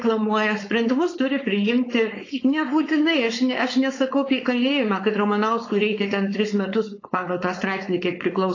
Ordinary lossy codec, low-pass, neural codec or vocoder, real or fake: AAC, 32 kbps; 7.2 kHz; codec, 16 kHz, 4.8 kbps, FACodec; fake